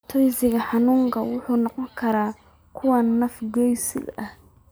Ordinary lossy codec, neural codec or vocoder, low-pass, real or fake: none; none; none; real